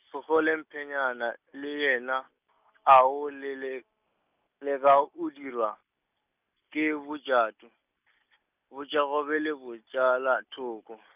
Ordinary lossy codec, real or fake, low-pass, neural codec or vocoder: none; real; 3.6 kHz; none